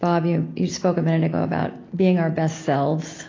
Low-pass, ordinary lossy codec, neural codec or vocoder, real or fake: 7.2 kHz; AAC, 32 kbps; none; real